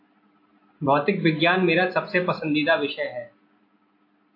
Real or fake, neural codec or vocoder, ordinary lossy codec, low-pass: real; none; AAC, 32 kbps; 5.4 kHz